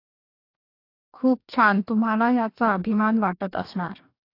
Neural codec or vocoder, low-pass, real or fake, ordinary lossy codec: codec, 44.1 kHz, 2.6 kbps, DAC; 5.4 kHz; fake; AAC, 32 kbps